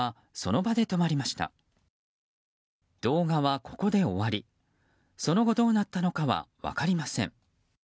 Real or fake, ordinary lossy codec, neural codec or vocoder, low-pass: real; none; none; none